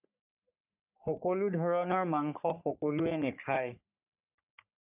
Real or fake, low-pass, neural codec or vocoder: fake; 3.6 kHz; codec, 44.1 kHz, 3.4 kbps, Pupu-Codec